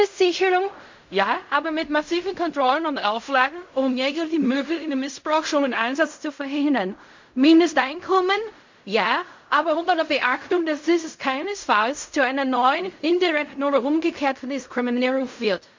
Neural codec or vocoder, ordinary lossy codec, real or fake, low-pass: codec, 16 kHz in and 24 kHz out, 0.4 kbps, LongCat-Audio-Codec, fine tuned four codebook decoder; MP3, 48 kbps; fake; 7.2 kHz